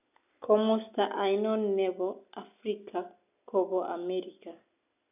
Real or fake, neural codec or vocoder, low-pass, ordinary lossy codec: real; none; 3.6 kHz; none